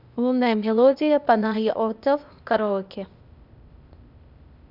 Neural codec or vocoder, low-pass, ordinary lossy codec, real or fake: codec, 16 kHz, 0.8 kbps, ZipCodec; 5.4 kHz; none; fake